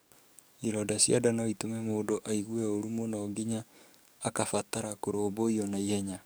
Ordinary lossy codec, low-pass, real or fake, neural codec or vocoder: none; none; fake; codec, 44.1 kHz, 7.8 kbps, DAC